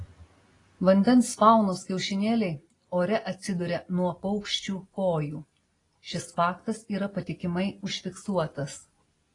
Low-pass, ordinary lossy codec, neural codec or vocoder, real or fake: 10.8 kHz; AAC, 32 kbps; none; real